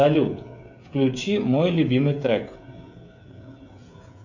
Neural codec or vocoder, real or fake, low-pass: codec, 16 kHz, 16 kbps, FreqCodec, smaller model; fake; 7.2 kHz